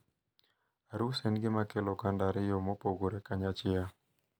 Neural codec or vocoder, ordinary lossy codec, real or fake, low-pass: none; none; real; none